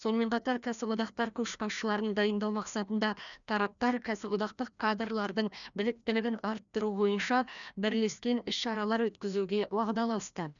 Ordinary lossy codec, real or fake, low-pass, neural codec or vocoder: none; fake; 7.2 kHz; codec, 16 kHz, 1 kbps, FreqCodec, larger model